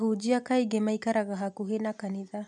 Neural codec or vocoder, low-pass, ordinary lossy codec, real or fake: none; 10.8 kHz; none; real